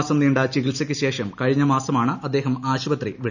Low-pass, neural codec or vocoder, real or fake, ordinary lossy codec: 7.2 kHz; none; real; none